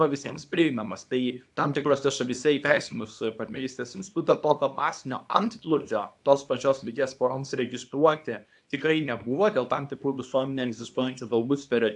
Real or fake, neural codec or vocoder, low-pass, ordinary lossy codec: fake; codec, 24 kHz, 0.9 kbps, WavTokenizer, small release; 10.8 kHz; AAC, 64 kbps